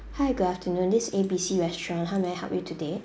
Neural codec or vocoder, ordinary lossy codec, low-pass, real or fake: none; none; none; real